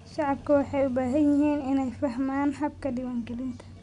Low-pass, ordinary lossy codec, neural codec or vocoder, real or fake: 10.8 kHz; none; none; real